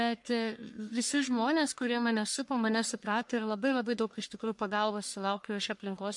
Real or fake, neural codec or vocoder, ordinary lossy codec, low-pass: fake; codec, 44.1 kHz, 1.7 kbps, Pupu-Codec; MP3, 64 kbps; 10.8 kHz